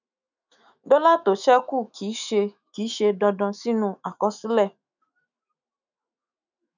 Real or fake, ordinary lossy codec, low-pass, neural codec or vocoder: fake; none; 7.2 kHz; autoencoder, 48 kHz, 128 numbers a frame, DAC-VAE, trained on Japanese speech